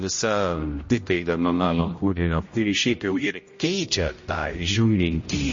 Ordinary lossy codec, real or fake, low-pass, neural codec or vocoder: MP3, 32 kbps; fake; 7.2 kHz; codec, 16 kHz, 0.5 kbps, X-Codec, HuBERT features, trained on general audio